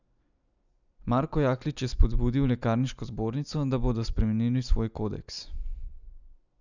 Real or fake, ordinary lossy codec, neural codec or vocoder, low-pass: real; none; none; 7.2 kHz